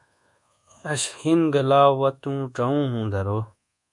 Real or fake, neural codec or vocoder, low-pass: fake; codec, 24 kHz, 1.2 kbps, DualCodec; 10.8 kHz